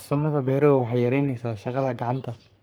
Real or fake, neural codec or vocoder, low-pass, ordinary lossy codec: fake; codec, 44.1 kHz, 3.4 kbps, Pupu-Codec; none; none